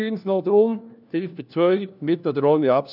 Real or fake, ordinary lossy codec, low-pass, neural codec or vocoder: fake; none; 5.4 kHz; codec, 16 kHz, 1 kbps, FunCodec, trained on LibriTTS, 50 frames a second